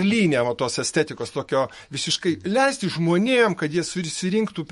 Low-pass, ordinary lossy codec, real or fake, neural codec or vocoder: 19.8 kHz; MP3, 48 kbps; fake; autoencoder, 48 kHz, 128 numbers a frame, DAC-VAE, trained on Japanese speech